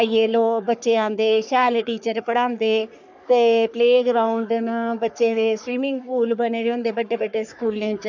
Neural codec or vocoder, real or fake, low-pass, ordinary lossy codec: codec, 44.1 kHz, 3.4 kbps, Pupu-Codec; fake; 7.2 kHz; none